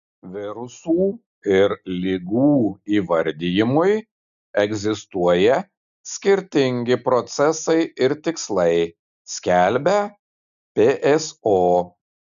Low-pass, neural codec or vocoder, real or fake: 7.2 kHz; none; real